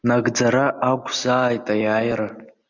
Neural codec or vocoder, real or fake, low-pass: none; real; 7.2 kHz